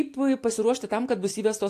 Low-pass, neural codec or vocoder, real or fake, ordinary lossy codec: 14.4 kHz; none; real; AAC, 64 kbps